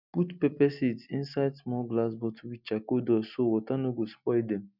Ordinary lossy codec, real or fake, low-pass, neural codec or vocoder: none; real; 5.4 kHz; none